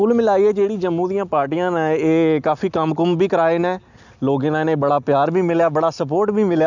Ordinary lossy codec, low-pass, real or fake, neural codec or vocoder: none; 7.2 kHz; real; none